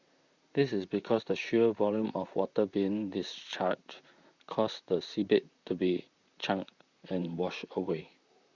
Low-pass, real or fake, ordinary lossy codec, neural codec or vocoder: 7.2 kHz; fake; Opus, 64 kbps; vocoder, 44.1 kHz, 128 mel bands, Pupu-Vocoder